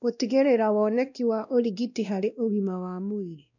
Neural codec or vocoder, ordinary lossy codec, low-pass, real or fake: codec, 16 kHz, 1 kbps, X-Codec, WavLM features, trained on Multilingual LibriSpeech; none; 7.2 kHz; fake